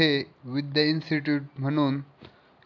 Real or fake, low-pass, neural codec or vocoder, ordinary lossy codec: real; 7.2 kHz; none; none